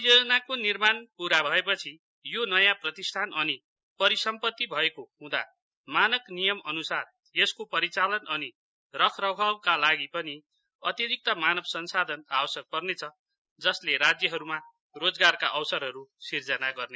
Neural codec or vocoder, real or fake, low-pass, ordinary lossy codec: none; real; none; none